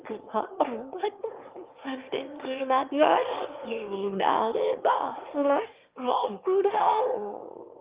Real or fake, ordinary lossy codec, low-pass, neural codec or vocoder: fake; Opus, 32 kbps; 3.6 kHz; autoencoder, 22.05 kHz, a latent of 192 numbers a frame, VITS, trained on one speaker